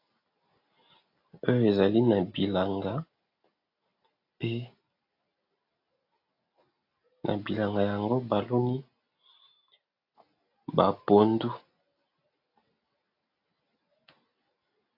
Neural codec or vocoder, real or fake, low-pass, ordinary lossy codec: none; real; 5.4 kHz; AAC, 32 kbps